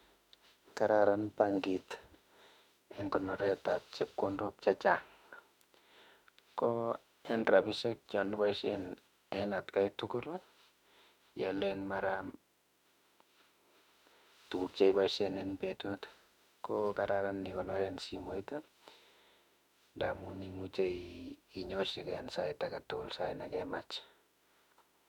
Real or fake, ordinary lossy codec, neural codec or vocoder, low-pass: fake; none; autoencoder, 48 kHz, 32 numbers a frame, DAC-VAE, trained on Japanese speech; 19.8 kHz